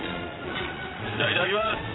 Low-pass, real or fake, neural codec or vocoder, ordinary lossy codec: 7.2 kHz; fake; vocoder, 22.05 kHz, 80 mel bands, Vocos; AAC, 16 kbps